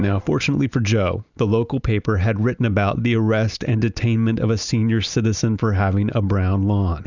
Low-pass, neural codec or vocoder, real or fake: 7.2 kHz; none; real